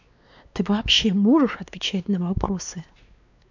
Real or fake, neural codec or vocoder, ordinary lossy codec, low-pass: fake; codec, 16 kHz, 2 kbps, X-Codec, WavLM features, trained on Multilingual LibriSpeech; none; 7.2 kHz